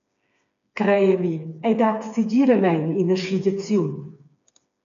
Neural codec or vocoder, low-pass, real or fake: codec, 16 kHz, 4 kbps, FreqCodec, smaller model; 7.2 kHz; fake